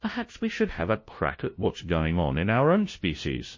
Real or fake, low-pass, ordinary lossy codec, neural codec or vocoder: fake; 7.2 kHz; MP3, 32 kbps; codec, 16 kHz, 0.5 kbps, FunCodec, trained on LibriTTS, 25 frames a second